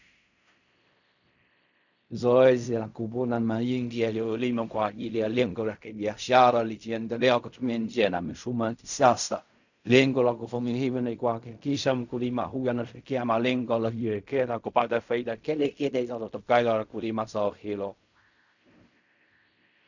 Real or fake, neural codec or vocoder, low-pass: fake; codec, 16 kHz in and 24 kHz out, 0.4 kbps, LongCat-Audio-Codec, fine tuned four codebook decoder; 7.2 kHz